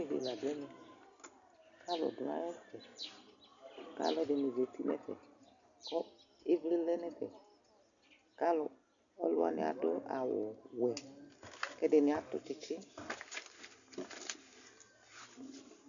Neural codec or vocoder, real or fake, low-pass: none; real; 7.2 kHz